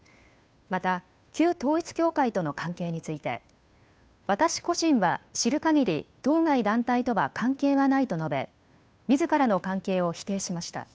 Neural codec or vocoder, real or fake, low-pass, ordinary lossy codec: codec, 16 kHz, 2 kbps, FunCodec, trained on Chinese and English, 25 frames a second; fake; none; none